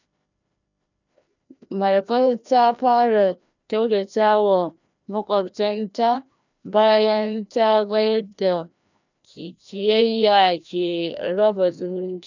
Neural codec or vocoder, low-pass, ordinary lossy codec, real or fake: codec, 16 kHz, 1 kbps, FreqCodec, larger model; 7.2 kHz; none; fake